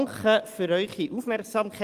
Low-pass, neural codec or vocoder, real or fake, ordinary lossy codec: 14.4 kHz; none; real; Opus, 24 kbps